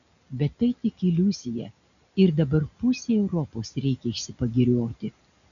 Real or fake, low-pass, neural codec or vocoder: real; 7.2 kHz; none